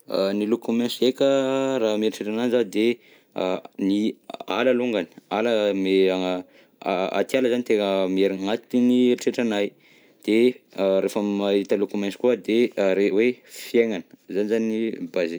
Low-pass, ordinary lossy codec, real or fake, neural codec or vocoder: none; none; real; none